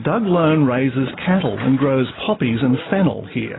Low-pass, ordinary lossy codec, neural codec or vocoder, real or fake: 7.2 kHz; AAC, 16 kbps; none; real